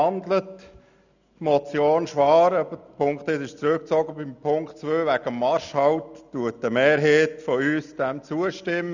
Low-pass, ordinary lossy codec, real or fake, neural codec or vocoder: 7.2 kHz; none; real; none